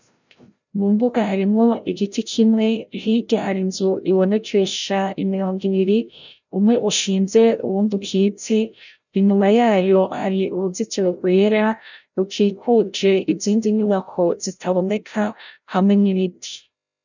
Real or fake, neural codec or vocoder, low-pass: fake; codec, 16 kHz, 0.5 kbps, FreqCodec, larger model; 7.2 kHz